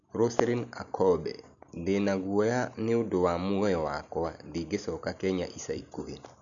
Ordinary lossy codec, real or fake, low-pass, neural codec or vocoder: MP3, 96 kbps; real; 7.2 kHz; none